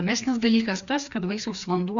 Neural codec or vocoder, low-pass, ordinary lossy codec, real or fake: codec, 16 kHz, 2 kbps, FreqCodec, larger model; 7.2 kHz; Opus, 64 kbps; fake